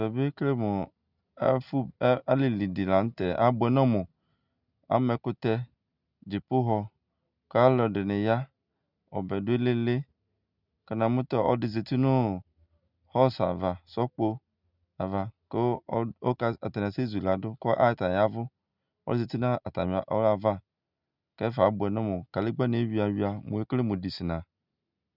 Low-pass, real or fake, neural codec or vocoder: 5.4 kHz; real; none